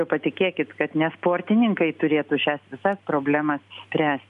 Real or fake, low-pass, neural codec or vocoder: real; 10.8 kHz; none